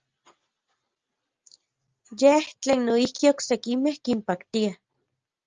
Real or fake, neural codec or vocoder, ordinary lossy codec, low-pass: real; none; Opus, 24 kbps; 7.2 kHz